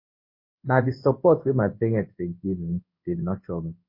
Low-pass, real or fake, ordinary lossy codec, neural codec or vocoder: 5.4 kHz; fake; MP3, 32 kbps; codec, 16 kHz in and 24 kHz out, 1 kbps, XY-Tokenizer